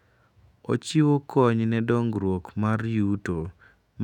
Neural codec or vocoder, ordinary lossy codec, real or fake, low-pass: autoencoder, 48 kHz, 128 numbers a frame, DAC-VAE, trained on Japanese speech; none; fake; 19.8 kHz